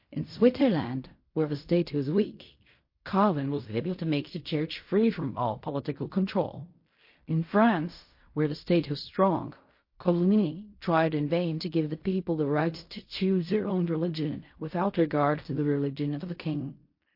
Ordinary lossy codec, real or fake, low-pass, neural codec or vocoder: MP3, 32 kbps; fake; 5.4 kHz; codec, 16 kHz in and 24 kHz out, 0.4 kbps, LongCat-Audio-Codec, fine tuned four codebook decoder